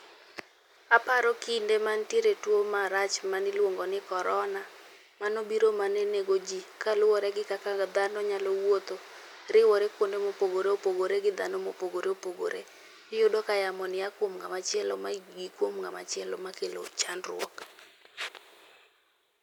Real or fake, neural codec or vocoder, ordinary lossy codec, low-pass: real; none; none; 19.8 kHz